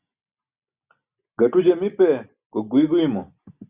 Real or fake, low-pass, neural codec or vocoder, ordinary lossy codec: real; 3.6 kHz; none; Opus, 64 kbps